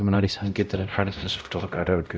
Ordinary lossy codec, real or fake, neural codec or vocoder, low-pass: none; fake; codec, 16 kHz, 0.5 kbps, X-Codec, WavLM features, trained on Multilingual LibriSpeech; none